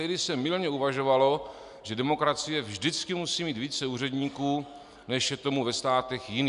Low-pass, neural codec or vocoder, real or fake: 10.8 kHz; none; real